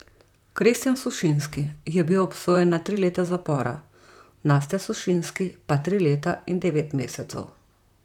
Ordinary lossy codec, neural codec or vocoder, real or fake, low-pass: none; vocoder, 44.1 kHz, 128 mel bands, Pupu-Vocoder; fake; 19.8 kHz